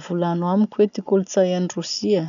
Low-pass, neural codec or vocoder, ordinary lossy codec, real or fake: 7.2 kHz; none; none; real